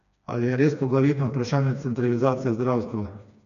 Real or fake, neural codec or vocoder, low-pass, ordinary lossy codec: fake; codec, 16 kHz, 2 kbps, FreqCodec, smaller model; 7.2 kHz; none